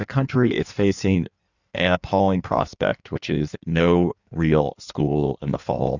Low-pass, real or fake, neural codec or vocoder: 7.2 kHz; fake; codec, 16 kHz in and 24 kHz out, 1.1 kbps, FireRedTTS-2 codec